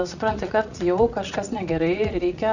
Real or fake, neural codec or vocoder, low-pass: fake; vocoder, 44.1 kHz, 80 mel bands, Vocos; 7.2 kHz